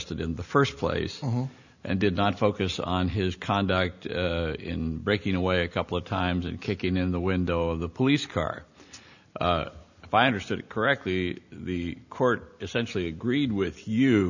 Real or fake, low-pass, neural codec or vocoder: real; 7.2 kHz; none